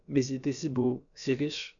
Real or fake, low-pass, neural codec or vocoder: fake; 7.2 kHz; codec, 16 kHz, about 1 kbps, DyCAST, with the encoder's durations